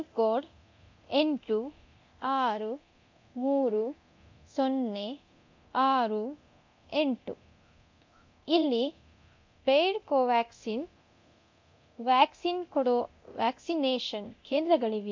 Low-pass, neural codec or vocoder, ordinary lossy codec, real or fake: 7.2 kHz; codec, 24 kHz, 0.9 kbps, DualCodec; MP3, 48 kbps; fake